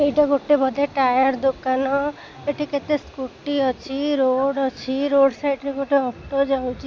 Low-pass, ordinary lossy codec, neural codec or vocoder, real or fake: none; none; none; real